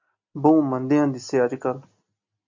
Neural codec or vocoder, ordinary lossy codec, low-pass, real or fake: none; MP3, 48 kbps; 7.2 kHz; real